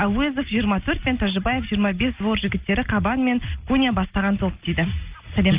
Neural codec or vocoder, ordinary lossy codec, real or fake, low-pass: none; Opus, 24 kbps; real; 3.6 kHz